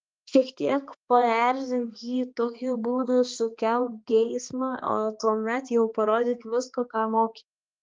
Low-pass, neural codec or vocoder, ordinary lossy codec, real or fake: 7.2 kHz; codec, 16 kHz, 2 kbps, X-Codec, HuBERT features, trained on balanced general audio; Opus, 32 kbps; fake